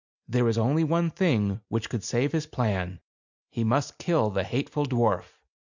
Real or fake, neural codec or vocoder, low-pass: real; none; 7.2 kHz